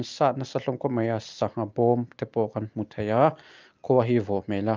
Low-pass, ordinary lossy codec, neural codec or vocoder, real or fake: 7.2 kHz; Opus, 24 kbps; none; real